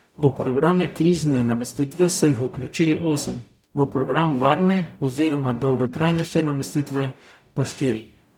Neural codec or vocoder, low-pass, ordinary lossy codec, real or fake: codec, 44.1 kHz, 0.9 kbps, DAC; 19.8 kHz; none; fake